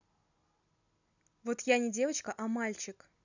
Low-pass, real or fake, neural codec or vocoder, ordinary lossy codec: 7.2 kHz; real; none; none